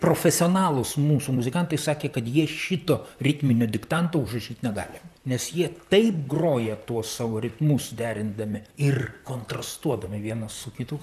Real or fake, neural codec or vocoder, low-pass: fake; vocoder, 44.1 kHz, 128 mel bands, Pupu-Vocoder; 14.4 kHz